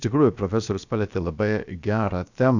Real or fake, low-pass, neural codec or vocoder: fake; 7.2 kHz; codec, 16 kHz, about 1 kbps, DyCAST, with the encoder's durations